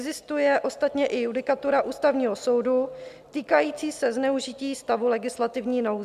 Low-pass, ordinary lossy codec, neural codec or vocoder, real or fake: 14.4 kHz; MP3, 96 kbps; none; real